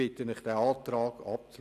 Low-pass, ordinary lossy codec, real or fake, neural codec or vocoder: 14.4 kHz; none; real; none